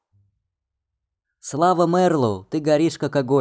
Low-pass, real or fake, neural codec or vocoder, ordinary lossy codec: none; real; none; none